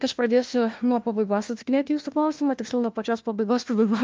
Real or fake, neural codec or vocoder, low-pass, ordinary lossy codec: fake; codec, 16 kHz, 1 kbps, FunCodec, trained on LibriTTS, 50 frames a second; 7.2 kHz; Opus, 24 kbps